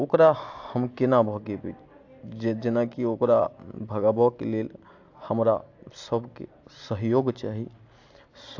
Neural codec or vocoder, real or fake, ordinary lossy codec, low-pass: none; real; AAC, 48 kbps; 7.2 kHz